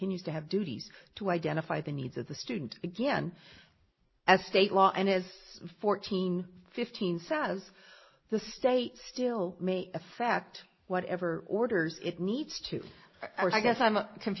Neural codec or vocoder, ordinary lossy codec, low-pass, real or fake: none; MP3, 24 kbps; 7.2 kHz; real